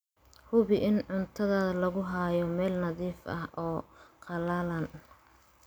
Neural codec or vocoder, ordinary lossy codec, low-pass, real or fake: none; none; none; real